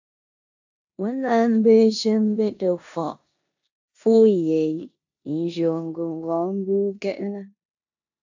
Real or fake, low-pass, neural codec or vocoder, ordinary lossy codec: fake; 7.2 kHz; codec, 16 kHz in and 24 kHz out, 0.9 kbps, LongCat-Audio-Codec, four codebook decoder; AAC, 48 kbps